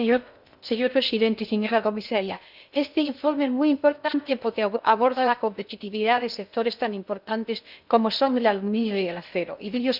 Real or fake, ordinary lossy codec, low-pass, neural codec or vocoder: fake; none; 5.4 kHz; codec, 16 kHz in and 24 kHz out, 0.6 kbps, FocalCodec, streaming, 2048 codes